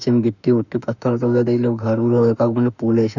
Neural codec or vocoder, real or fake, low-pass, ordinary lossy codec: autoencoder, 48 kHz, 32 numbers a frame, DAC-VAE, trained on Japanese speech; fake; 7.2 kHz; none